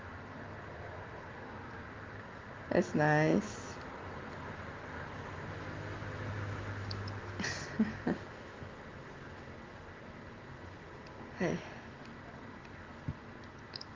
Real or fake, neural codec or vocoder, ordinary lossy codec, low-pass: real; none; Opus, 32 kbps; 7.2 kHz